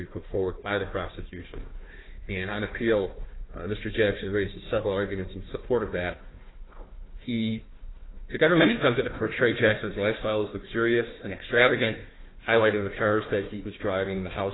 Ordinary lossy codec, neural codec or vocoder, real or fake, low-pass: AAC, 16 kbps; codec, 16 kHz, 1 kbps, FunCodec, trained on Chinese and English, 50 frames a second; fake; 7.2 kHz